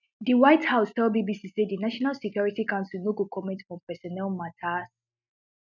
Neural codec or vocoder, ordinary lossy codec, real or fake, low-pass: none; none; real; 7.2 kHz